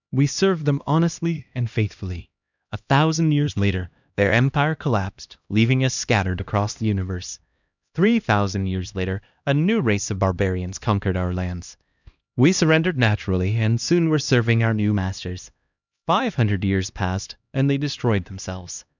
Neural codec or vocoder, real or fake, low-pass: codec, 16 kHz, 1 kbps, X-Codec, HuBERT features, trained on LibriSpeech; fake; 7.2 kHz